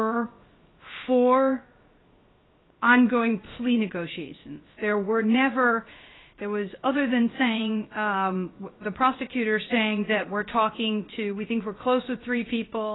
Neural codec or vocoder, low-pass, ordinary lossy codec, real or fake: codec, 16 kHz, 0.3 kbps, FocalCodec; 7.2 kHz; AAC, 16 kbps; fake